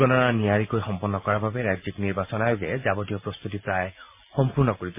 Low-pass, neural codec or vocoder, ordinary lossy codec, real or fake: 3.6 kHz; none; MP3, 32 kbps; real